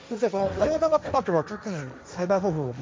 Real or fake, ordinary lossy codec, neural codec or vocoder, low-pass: fake; none; codec, 16 kHz, 1.1 kbps, Voila-Tokenizer; none